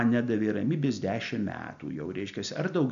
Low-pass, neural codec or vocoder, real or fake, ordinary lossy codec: 7.2 kHz; none; real; AAC, 96 kbps